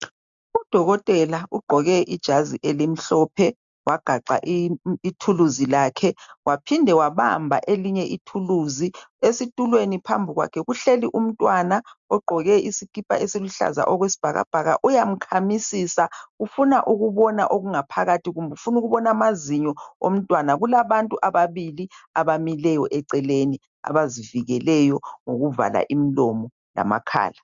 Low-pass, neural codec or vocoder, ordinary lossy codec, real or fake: 7.2 kHz; none; MP3, 64 kbps; real